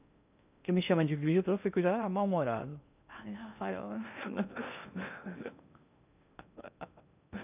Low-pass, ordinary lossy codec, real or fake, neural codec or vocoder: 3.6 kHz; none; fake; codec, 16 kHz in and 24 kHz out, 0.6 kbps, FocalCodec, streaming, 2048 codes